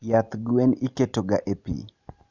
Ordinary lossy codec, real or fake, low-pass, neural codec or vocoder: none; real; 7.2 kHz; none